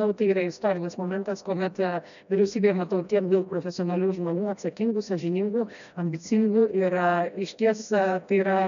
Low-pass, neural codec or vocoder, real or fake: 7.2 kHz; codec, 16 kHz, 1 kbps, FreqCodec, smaller model; fake